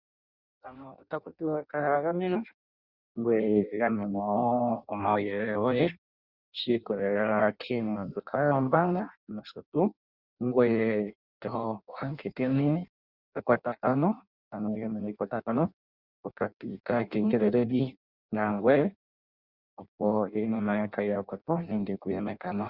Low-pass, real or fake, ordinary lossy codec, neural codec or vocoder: 5.4 kHz; fake; Opus, 64 kbps; codec, 16 kHz in and 24 kHz out, 0.6 kbps, FireRedTTS-2 codec